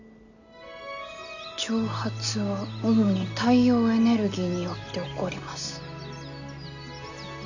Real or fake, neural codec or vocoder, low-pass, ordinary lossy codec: real; none; 7.2 kHz; none